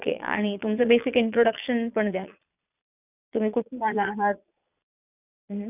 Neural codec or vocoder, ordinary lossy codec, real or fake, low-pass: vocoder, 22.05 kHz, 80 mel bands, Vocos; none; fake; 3.6 kHz